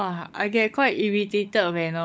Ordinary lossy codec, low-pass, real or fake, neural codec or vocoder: none; none; fake; codec, 16 kHz, 16 kbps, FunCodec, trained on LibriTTS, 50 frames a second